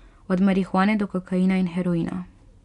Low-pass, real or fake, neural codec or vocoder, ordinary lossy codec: 10.8 kHz; real; none; none